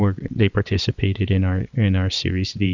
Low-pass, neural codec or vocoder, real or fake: 7.2 kHz; vocoder, 22.05 kHz, 80 mel bands, WaveNeXt; fake